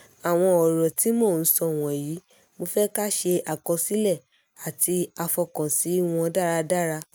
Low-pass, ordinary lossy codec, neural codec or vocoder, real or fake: none; none; none; real